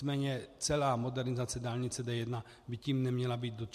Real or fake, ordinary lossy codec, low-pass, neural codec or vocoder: real; MP3, 64 kbps; 14.4 kHz; none